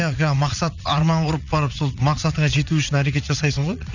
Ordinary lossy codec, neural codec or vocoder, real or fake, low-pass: none; vocoder, 44.1 kHz, 80 mel bands, Vocos; fake; 7.2 kHz